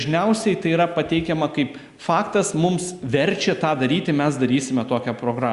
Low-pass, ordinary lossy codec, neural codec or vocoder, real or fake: 10.8 kHz; Opus, 64 kbps; none; real